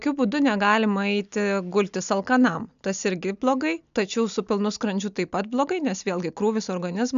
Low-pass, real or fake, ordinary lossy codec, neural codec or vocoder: 7.2 kHz; real; AAC, 96 kbps; none